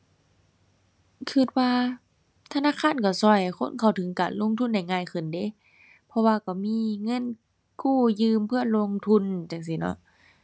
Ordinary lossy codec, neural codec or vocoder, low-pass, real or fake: none; none; none; real